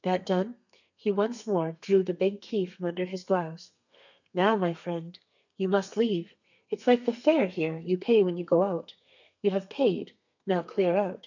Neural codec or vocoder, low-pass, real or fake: codec, 32 kHz, 1.9 kbps, SNAC; 7.2 kHz; fake